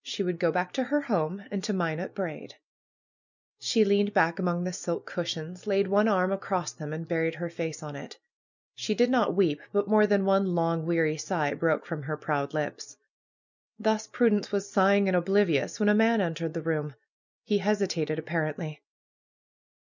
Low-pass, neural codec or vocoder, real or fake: 7.2 kHz; none; real